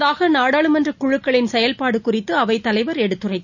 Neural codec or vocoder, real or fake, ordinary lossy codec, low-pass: none; real; none; 7.2 kHz